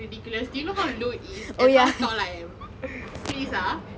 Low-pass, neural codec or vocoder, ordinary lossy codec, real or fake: none; none; none; real